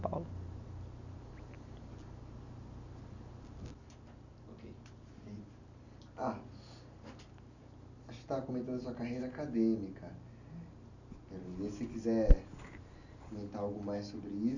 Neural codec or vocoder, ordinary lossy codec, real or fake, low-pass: none; none; real; 7.2 kHz